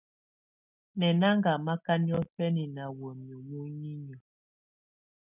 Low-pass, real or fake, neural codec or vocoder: 3.6 kHz; real; none